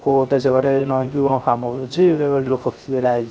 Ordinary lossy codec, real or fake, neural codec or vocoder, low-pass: none; fake; codec, 16 kHz, 0.3 kbps, FocalCodec; none